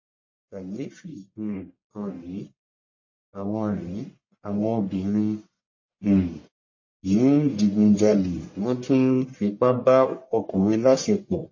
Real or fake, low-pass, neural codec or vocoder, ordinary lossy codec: fake; 7.2 kHz; codec, 44.1 kHz, 1.7 kbps, Pupu-Codec; MP3, 32 kbps